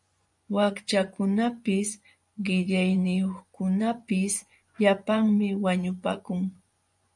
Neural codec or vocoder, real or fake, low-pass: vocoder, 24 kHz, 100 mel bands, Vocos; fake; 10.8 kHz